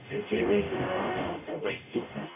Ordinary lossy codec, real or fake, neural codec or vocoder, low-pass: none; fake; codec, 44.1 kHz, 0.9 kbps, DAC; 3.6 kHz